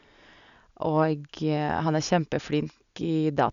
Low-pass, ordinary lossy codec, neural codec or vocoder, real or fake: 7.2 kHz; none; none; real